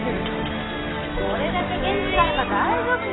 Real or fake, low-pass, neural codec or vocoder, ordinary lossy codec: real; 7.2 kHz; none; AAC, 16 kbps